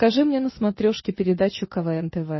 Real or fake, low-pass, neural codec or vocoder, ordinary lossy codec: real; 7.2 kHz; none; MP3, 24 kbps